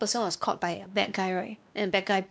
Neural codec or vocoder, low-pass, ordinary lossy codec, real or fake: codec, 16 kHz, 2 kbps, X-Codec, WavLM features, trained on Multilingual LibriSpeech; none; none; fake